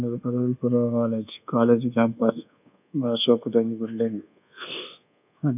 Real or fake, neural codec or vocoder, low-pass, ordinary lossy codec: fake; codec, 24 kHz, 1.2 kbps, DualCodec; 3.6 kHz; none